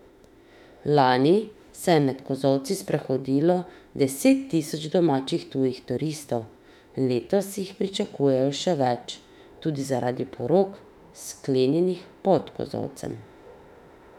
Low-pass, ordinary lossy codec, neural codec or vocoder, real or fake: 19.8 kHz; none; autoencoder, 48 kHz, 32 numbers a frame, DAC-VAE, trained on Japanese speech; fake